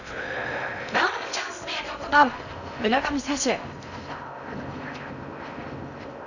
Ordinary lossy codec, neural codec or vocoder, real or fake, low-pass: none; codec, 16 kHz in and 24 kHz out, 0.6 kbps, FocalCodec, streaming, 2048 codes; fake; 7.2 kHz